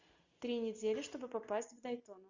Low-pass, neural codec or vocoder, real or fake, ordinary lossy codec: 7.2 kHz; none; real; Opus, 64 kbps